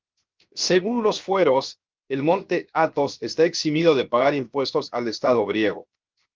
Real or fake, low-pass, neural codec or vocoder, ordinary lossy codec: fake; 7.2 kHz; codec, 16 kHz, 0.7 kbps, FocalCodec; Opus, 32 kbps